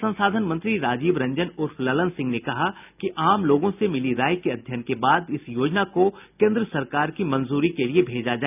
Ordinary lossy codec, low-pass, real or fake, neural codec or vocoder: none; 3.6 kHz; real; none